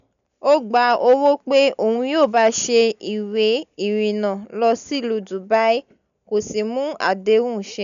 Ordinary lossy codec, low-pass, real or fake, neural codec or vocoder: none; 7.2 kHz; real; none